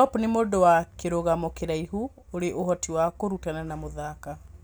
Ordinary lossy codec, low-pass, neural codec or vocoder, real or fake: none; none; none; real